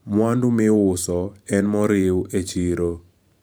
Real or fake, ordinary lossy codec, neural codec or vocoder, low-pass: fake; none; vocoder, 44.1 kHz, 128 mel bands every 512 samples, BigVGAN v2; none